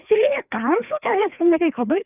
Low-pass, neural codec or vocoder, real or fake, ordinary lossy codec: 3.6 kHz; codec, 16 kHz, 2 kbps, FreqCodec, larger model; fake; none